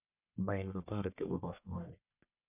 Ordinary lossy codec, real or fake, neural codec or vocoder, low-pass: none; fake; codec, 44.1 kHz, 1.7 kbps, Pupu-Codec; 3.6 kHz